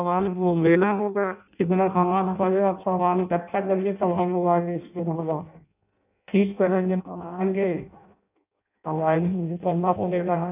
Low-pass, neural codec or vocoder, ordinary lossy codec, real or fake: 3.6 kHz; codec, 16 kHz in and 24 kHz out, 0.6 kbps, FireRedTTS-2 codec; none; fake